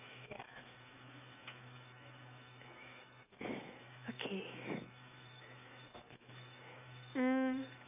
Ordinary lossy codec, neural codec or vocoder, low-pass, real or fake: none; autoencoder, 48 kHz, 128 numbers a frame, DAC-VAE, trained on Japanese speech; 3.6 kHz; fake